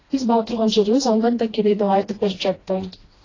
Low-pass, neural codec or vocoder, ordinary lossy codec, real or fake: 7.2 kHz; codec, 16 kHz, 1 kbps, FreqCodec, smaller model; AAC, 32 kbps; fake